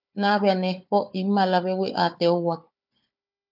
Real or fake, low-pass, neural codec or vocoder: fake; 5.4 kHz; codec, 16 kHz, 4 kbps, FunCodec, trained on Chinese and English, 50 frames a second